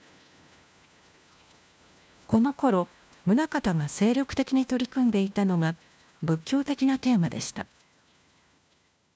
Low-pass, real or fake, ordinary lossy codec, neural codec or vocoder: none; fake; none; codec, 16 kHz, 1 kbps, FunCodec, trained on LibriTTS, 50 frames a second